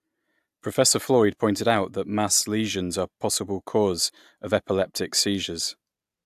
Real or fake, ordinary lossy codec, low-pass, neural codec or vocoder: real; AAC, 96 kbps; 14.4 kHz; none